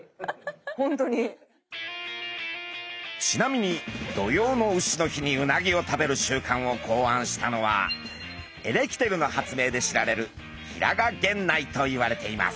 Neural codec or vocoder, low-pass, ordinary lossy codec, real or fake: none; none; none; real